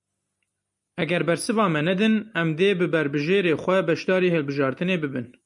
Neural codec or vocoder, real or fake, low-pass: none; real; 10.8 kHz